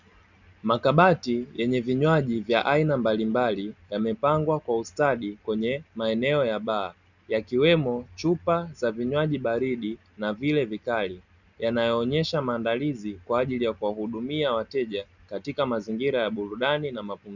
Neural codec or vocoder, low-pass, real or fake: none; 7.2 kHz; real